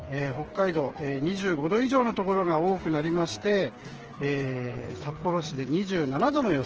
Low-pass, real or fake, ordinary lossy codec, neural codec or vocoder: 7.2 kHz; fake; Opus, 16 kbps; codec, 16 kHz, 4 kbps, FreqCodec, smaller model